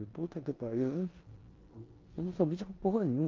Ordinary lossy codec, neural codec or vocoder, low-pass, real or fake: Opus, 24 kbps; codec, 16 kHz in and 24 kHz out, 0.9 kbps, LongCat-Audio-Codec, four codebook decoder; 7.2 kHz; fake